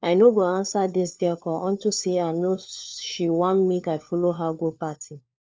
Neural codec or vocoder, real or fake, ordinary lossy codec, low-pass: codec, 16 kHz, 4 kbps, FunCodec, trained on LibriTTS, 50 frames a second; fake; none; none